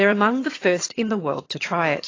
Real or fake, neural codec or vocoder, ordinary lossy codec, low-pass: fake; vocoder, 22.05 kHz, 80 mel bands, HiFi-GAN; AAC, 32 kbps; 7.2 kHz